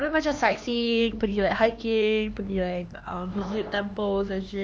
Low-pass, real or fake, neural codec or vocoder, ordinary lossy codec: none; fake; codec, 16 kHz, 2 kbps, X-Codec, HuBERT features, trained on LibriSpeech; none